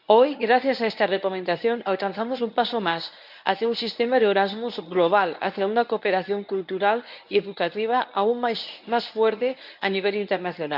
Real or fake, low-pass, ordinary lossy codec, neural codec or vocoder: fake; 5.4 kHz; none; codec, 24 kHz, 0.9 kbps, WavTokenizer, medium speech release version 1